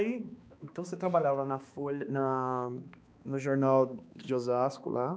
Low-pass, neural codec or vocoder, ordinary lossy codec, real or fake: none; codec, 16 kHz, 2 kbps, X-Codec, HuBERT features, trained on balanced general audio; none; fake